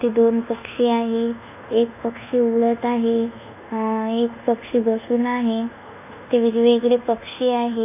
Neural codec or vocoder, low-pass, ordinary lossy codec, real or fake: codec, 24 kHz, 1.2 kbps, DualCodec; 3.6 kHz; none; fake